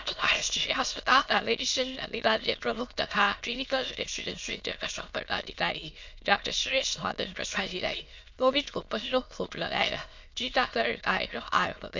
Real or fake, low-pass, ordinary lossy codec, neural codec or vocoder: fake; 7.2 kHz; MP3, 64 kbps; autoencoder, 22.05 kHz, a latent of 192 numbers a frame, VITS, trained on many speakers